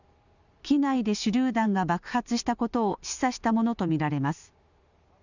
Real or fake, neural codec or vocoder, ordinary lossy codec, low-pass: real; none; none; 7.2 kHz